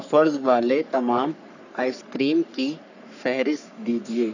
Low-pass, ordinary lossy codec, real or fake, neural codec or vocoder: 7.2 kHz; none; fake; codec, 44.1 kHz, 3.4 kbps, Pupu-Codec